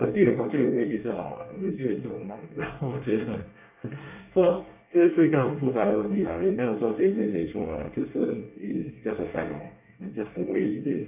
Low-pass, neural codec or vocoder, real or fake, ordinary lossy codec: 3.6 kHz; codec, 24 kHz, 1 kbps, SNAC; fake; none